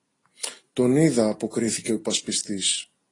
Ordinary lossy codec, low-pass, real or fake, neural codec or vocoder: AAC, 32 kbps; 10.8 kHz; real; none